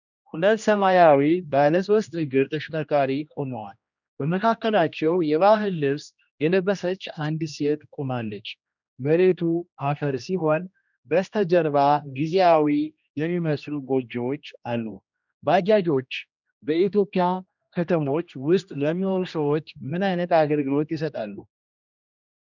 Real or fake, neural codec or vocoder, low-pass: fake; codec, 16 kHz, 1 kbps, X-Codec, HuBERT features, trained on general audio; 7.2 kHz